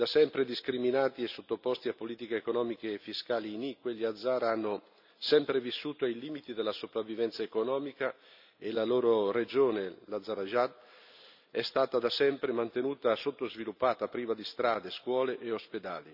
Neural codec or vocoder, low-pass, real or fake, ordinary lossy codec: none; 5.4 kHz; real; none